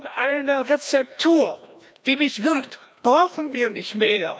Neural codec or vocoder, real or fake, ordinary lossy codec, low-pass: codec, 16 kHz, 1 kbps, FreqCodec, larger model; fake; none; none